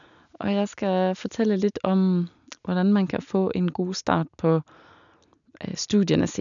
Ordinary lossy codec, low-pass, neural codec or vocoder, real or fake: none; 7.2 kHz; none; real